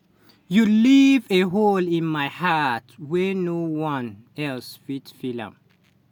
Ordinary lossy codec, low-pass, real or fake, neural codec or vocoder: none; 19.8 kHz; real; none